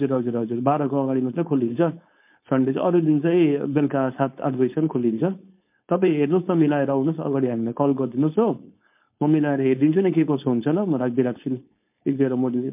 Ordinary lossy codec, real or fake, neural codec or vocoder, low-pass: MP3, 32 kbps; fake; codec, 16 kHz, 4.8 kbps, FACodec; 3.6 kHz